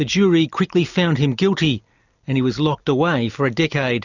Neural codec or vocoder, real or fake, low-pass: none; real; 7.2 kHz